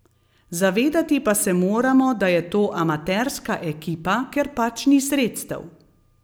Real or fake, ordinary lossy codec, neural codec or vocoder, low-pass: real; none; none; none